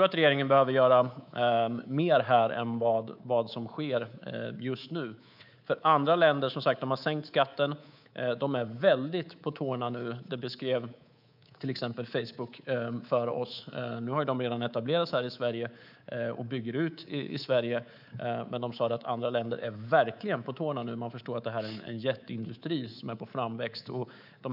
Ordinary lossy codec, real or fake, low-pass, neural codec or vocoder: none; fake; 5.4 kHz; codec, 24 kHz, 3.1 kbps, DualCodec